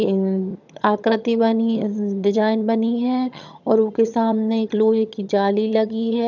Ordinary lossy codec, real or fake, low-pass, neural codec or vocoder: none; fake; 7.2 kHz; vocoder, 22.05 kHz, 80 mel bands, HiFi-GAN